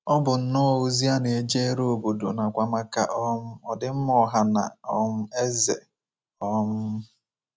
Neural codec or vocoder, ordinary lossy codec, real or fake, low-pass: none; none; real; none